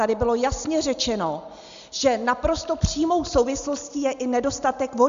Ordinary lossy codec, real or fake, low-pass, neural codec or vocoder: Opus, 64 kbps; real; 7.2 kHz; none